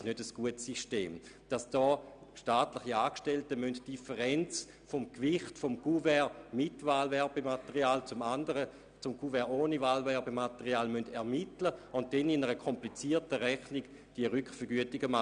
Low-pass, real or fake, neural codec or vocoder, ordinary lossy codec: 9.9 kHz; real; none; none